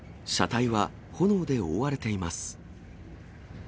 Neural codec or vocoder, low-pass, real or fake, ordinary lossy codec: none; none; real; none